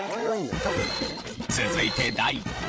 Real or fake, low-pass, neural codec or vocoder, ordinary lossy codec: fake; none; codec, 16 kHz, 16 kbps, FreqCodec, larger model; none